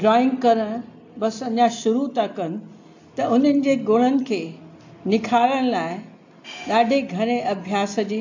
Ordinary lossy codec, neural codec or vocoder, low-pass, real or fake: AAC, 48 kbps; none; 7.2 kHz; real